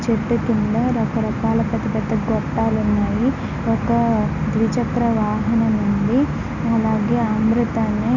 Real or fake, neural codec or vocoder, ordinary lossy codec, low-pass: real; none; none; 7.2 kHz